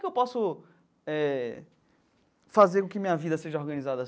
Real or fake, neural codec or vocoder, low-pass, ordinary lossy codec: real; none; none; none